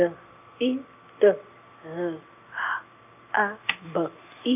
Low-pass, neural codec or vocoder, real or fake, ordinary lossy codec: 3.6 kHz; none; real; none